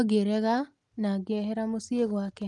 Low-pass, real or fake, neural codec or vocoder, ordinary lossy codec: 10.8 kHz; real; none; Opus, 32 kbps